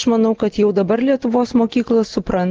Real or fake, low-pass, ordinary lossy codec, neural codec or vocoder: real; 7.2 kHz; Opus, 16 kbps; none